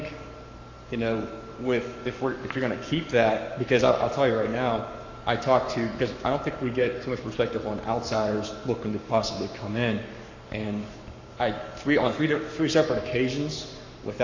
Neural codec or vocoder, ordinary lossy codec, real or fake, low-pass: codec, 44.1 kHz, 7.8 kbps, Pupu-Codec; AAC, 48 kbps; fake; 7.2 kHz